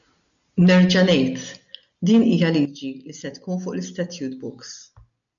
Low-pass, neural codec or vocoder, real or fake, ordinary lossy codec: 7.2 kHz; none; real; MP3, 96 kbps